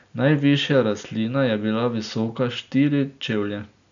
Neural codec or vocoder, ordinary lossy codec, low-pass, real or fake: none; none; 7.2 kHz; real